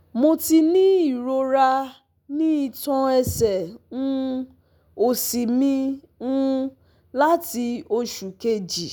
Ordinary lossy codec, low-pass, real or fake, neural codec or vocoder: none; none; real; none